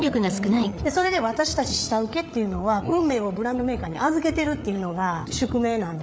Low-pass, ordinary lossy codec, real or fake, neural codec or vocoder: none; none; fake; codec, 16 kHz, 8 kbps, FreqCodec, larger model